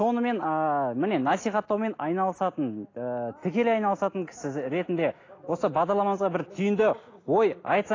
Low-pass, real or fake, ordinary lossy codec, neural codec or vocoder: 7.2 kHz; real; AAC, 32 kbps; none